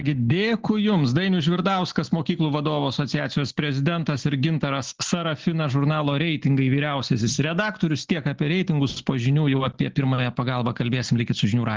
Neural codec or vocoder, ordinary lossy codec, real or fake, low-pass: none; Opus, 16 kbps; real; 7.2 kHz